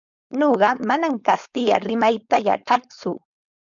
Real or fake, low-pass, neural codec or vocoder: fake; 7.2 kHz; codec, 16 kHz, 4.8 kbps, FACodec